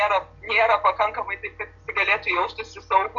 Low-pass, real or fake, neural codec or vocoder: 7.2 kHz; real; none